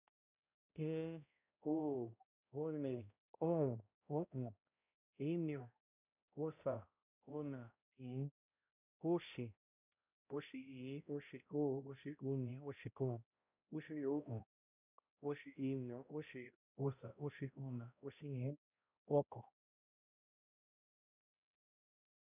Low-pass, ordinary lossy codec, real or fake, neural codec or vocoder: 3.6 kHz; none; fake; codec, 16 kHz, 0.5 kbps, X-Codec, HuBERT features, trained on balanced general audio